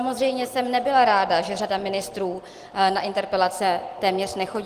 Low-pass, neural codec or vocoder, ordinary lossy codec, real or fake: 14.4 kHz; none; Opus, 24 kbps; real